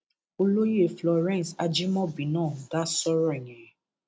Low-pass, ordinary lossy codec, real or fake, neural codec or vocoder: none; none; real; none